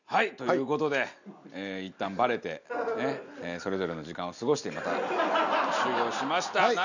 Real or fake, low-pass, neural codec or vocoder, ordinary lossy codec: real; 7.2 kHz; none; none